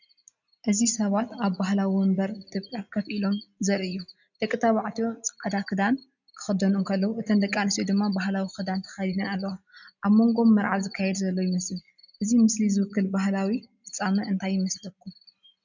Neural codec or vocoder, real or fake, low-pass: none; real; 7.2 kHz